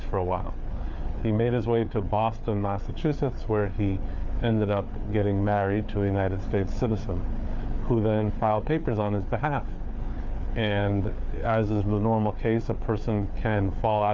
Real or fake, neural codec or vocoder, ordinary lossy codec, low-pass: fake; codec, 16 kHz, 4 kbps, FreqCodec, larger model; MP3, 64 kbps; 7.2 kHz